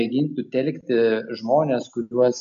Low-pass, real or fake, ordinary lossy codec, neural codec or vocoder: 7.2 kHz; real; MP3, 48 kbps; none